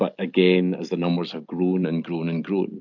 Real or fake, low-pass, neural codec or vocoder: real; 7.2 kHz; none